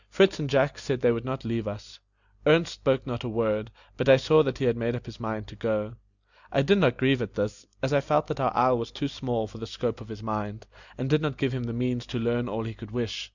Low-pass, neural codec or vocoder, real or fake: 7.2 kHz; none; real